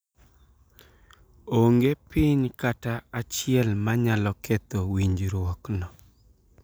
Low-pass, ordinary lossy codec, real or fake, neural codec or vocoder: none; none; real; none